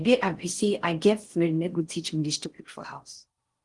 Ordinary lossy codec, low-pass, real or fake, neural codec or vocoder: Opus, 24 kbps; 10.8 kHz; fake; codec, 16 kHz in and 24 kHz out, 0.6 kbps, FocalCodec, streaming, 4096 codes